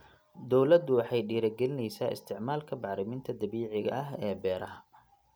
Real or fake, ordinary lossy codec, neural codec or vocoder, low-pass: real; none; none; none